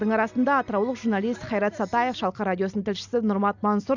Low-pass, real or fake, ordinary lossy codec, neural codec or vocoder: 7.2 kHz; real; none; none